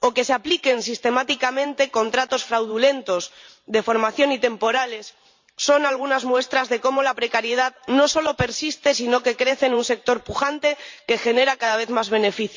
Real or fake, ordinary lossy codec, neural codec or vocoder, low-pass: fake; MP3, 64 kbps; vocoder, 44.1 kHz, 128 mel bands every 512 samples, BigVGAN v2; 7.2 kHz